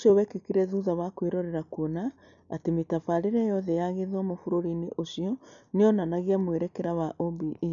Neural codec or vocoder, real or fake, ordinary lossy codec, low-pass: none; real; none; 7.2 kHz